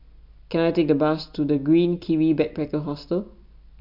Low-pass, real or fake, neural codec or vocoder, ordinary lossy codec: 5.4 kHz; real; none; MP3, 48 kbps